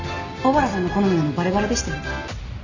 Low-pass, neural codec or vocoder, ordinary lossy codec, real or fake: 7.2 kHz; none; none; real